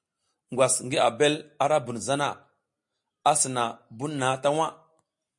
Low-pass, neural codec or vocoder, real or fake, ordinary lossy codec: 10.8 kHz; none; real; MP3, 48 kbps